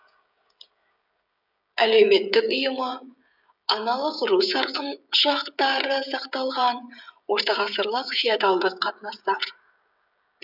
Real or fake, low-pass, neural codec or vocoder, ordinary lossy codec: fake; 5.4 kHz; codec, 16 kHz, 16 kbps, FreqCodec, smaller model; none